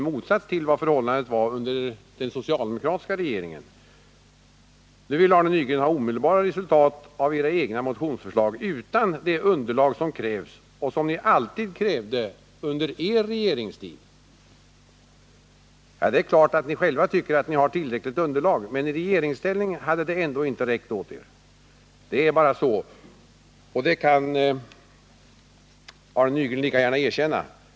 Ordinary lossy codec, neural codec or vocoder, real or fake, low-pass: none; none; real; none